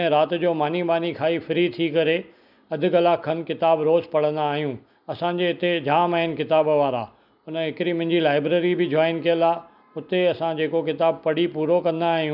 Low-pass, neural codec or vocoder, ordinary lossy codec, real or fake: 5.4 kHz; none; none; real